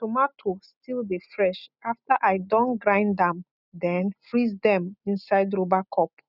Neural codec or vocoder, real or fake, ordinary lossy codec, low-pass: none; real; none; 5.4 kHz